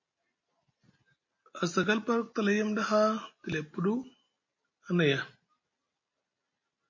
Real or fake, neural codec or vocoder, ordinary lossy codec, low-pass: real; none; MP3, 32 kbps; 7.2 kHz